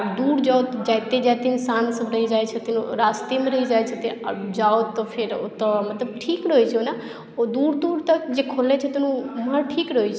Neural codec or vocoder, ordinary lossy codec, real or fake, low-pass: none; none; real; none